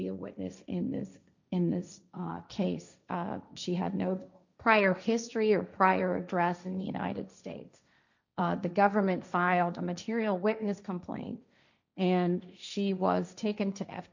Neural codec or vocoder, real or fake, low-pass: codec, 16 kHz, 1.1 kbps, Voila-Tokenizer; fake; 7.2 kHz